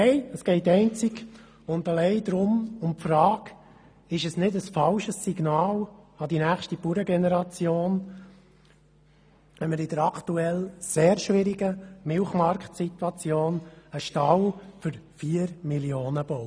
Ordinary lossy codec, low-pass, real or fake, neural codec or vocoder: none; none; real; none